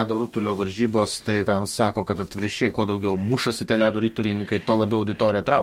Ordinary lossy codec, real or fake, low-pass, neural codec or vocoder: MP3, 64 kbps; fake; 19.8 kHz; codec, 44.1 kHz, 2.6 kbps, DAC